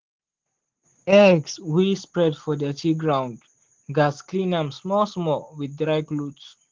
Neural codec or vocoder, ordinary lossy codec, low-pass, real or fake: none; Opus, 16 kbps; 7.2 kHz; real